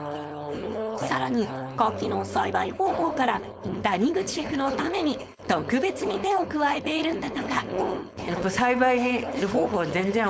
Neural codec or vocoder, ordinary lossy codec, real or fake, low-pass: codec, 16 kHz, 4.8 kbps, FACodec; none; fake; none